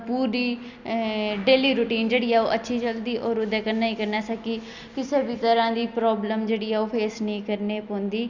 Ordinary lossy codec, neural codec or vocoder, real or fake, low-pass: Opus, 64 kbps; none; real; 7.2 kHz